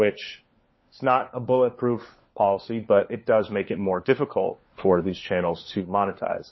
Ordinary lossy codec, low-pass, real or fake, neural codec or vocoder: MP3, 24 kbps; 7.2 kHz; fake; codec, 16 kHz, 4 kbps, FunCodec, trained on LibriTTS, 50 frames a second